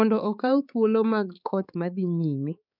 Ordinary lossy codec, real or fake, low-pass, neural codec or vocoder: none; fake; 5.4 kHz; codec, 16 kHz, 4 kbps, X-Codec, HuBERT features, trained on balanced general audio